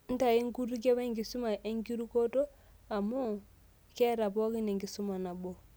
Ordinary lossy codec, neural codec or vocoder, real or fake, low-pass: none; none; real; none